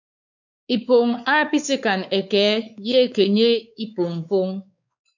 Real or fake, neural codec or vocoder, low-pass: fake; codec, 16 kHz, 4 kbps, X-Codec, WavLM features, trained on Multilingual LibriSpeech; 7.2 kHz